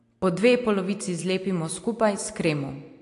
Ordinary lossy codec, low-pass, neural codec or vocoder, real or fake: AAC, 48 kbps; 10.8 kHz; none; real